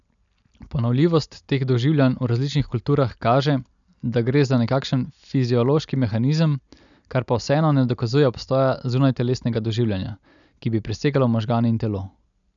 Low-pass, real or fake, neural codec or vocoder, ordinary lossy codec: 7.2 kHz; real; none; none